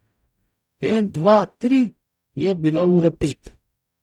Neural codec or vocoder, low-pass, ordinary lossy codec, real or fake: codec, 44.1 kHz, 0.9 kbps, DAC; 19.8 kHz; none; fake